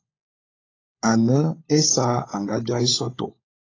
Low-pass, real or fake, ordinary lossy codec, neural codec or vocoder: 7.2 kHz; fake; AAC, 32 kbps; codec, 16 kHz, 16 kbps, FunCodec, trained on LibriTTS, 50 frames a second